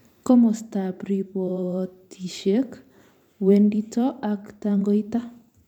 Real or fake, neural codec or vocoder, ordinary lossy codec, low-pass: fake; vocoder, 44.1 kHz, 128 mel bands every 512 samples, BigVGAN v2; none; 19.8 kHz